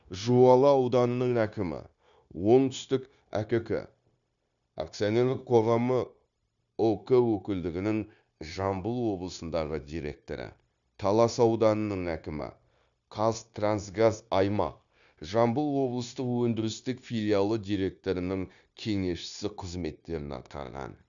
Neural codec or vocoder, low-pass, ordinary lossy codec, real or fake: codec, 16 kHz, 0.9 kbps, LongCat-Audio-Codec; 7.2 kHz; none; fake